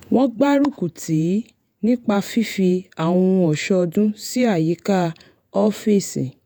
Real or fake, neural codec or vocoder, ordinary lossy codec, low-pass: fake; vocoder, 48 kHz, 128 mel bands, Vocos; none; none